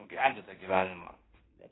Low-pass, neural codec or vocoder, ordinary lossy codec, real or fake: 7.2 kHz; codec, 16 kHz in and 24 kHz out, 0.9 kbps, LongCat-Audio-Codec, fine tuned four codebook decoder; AAC, 16 kbps; fake